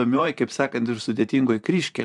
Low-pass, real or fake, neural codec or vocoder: 10.8 kHz; fake; vocoder, 44.1 kHz, 128 mel bands, Pupu-Vocoder